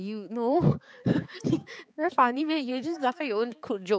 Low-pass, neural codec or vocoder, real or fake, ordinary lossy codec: none; codec, 16 kHz, 4 kbps, X-Codec, HuBERT features, trained on balanced general audio; fake; none